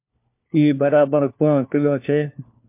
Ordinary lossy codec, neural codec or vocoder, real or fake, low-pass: MP3, 32 kbps; codec, 16 kHz, 1 kbps, FunCodec, trained on LibriTTS, 50 frames a second; fake; 3.6 kHz